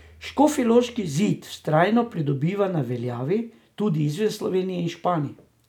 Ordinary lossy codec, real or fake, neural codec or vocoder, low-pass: none; real; none; 19.8 kHz